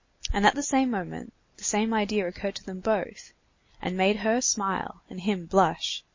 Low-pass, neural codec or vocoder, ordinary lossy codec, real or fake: 7.2 kHz; none; MP3, 32 kbps; real